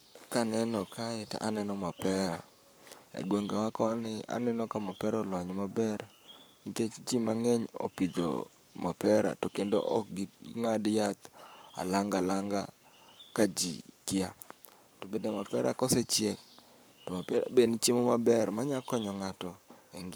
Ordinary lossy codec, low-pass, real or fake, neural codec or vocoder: none; none; fake; codec, 44.1 kHz, 7.8 kbps, Pupu-Codec